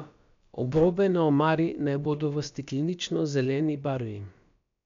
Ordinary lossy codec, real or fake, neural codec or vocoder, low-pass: MP3, 64 kbps; fake; codec, 16 kHz, about 1 kbps, DyCAST, with the encoder's durations; 7.2 kHz